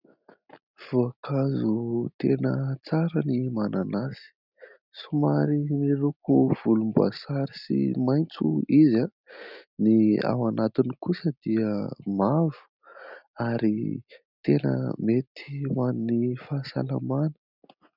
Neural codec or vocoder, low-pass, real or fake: none; 5.4 kHz; real